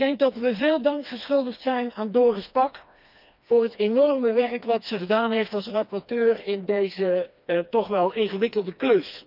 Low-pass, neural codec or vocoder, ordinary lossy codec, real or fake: 5.4 kHz; codec, 16 kHz, 2 kbps, FreqCodec, smaller model; none; fake